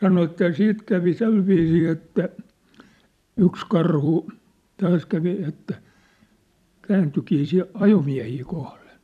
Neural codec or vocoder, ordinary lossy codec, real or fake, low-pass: vocoder, 44.1 kHz, 128 mel bands every 256 samples, BigVGAN v2; none; fake; 14.4 kHz